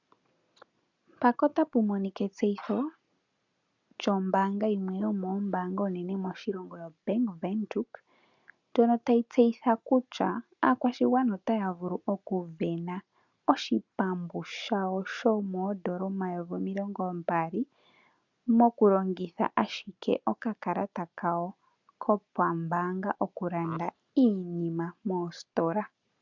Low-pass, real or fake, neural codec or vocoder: 7.2 kHz; real; none